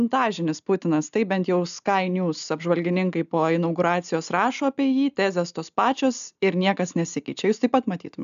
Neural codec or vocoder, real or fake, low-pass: none; real; 7.2 kHz